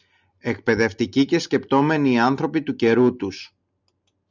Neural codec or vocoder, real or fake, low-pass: none; real; 7.2 kHz